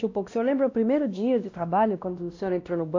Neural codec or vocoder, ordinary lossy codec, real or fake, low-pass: codec, 16 kHz, 1 kbps, X-Codec, WavLM features, trained on Multilingual LibriSpeech; AAC, 48 kbps; fake; 7.2 kHz